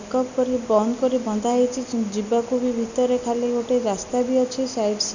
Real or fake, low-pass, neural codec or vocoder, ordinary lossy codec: real; 7.2 kHz; none; none